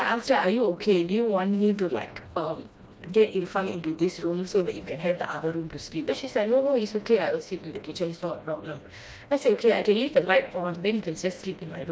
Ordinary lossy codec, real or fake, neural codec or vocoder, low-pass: none; fake; codec, 16 kHz, 1 kbps, FreqCodec, smaller model; none